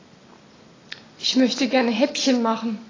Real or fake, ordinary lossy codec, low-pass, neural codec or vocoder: real; AAC, 32 kbps; 7.2 kHz; none